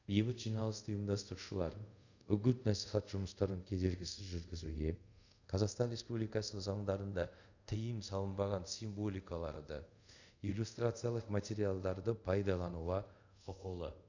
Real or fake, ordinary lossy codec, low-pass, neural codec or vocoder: fake; none; 7.2 kHz; codec, 24 kHz, 0.5 kbps, DualCodec